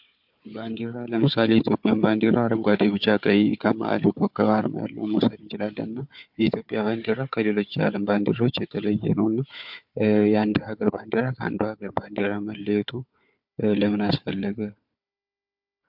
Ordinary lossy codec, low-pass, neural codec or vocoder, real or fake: MP3, 48 kbps; 5.4 kHz; codec, 16 kHz, 4 kbps, FunCodec, trained on Chinese and English, 50 frames a second; fake